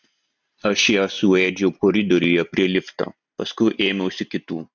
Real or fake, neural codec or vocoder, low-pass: real; none; 7.2 kHz